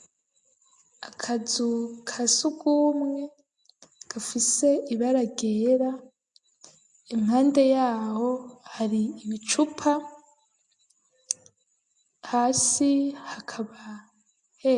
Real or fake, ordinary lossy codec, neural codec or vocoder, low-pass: real; MP3, 64 kbps; none; 10.8 kHz